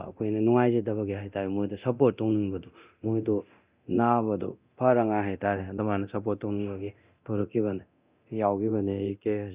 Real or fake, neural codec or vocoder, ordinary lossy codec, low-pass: fake; codec, 24 kHz, 0.9 kbps, DualCodec; Opus, 64 kbps; 3.6 kHz